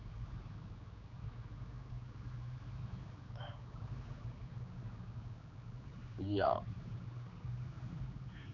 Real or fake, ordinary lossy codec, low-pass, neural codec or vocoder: fake; none; 7.2 kHz; codec, 16 kHz, 4 kbps, X-Codec, HuBERT features, trained on general audio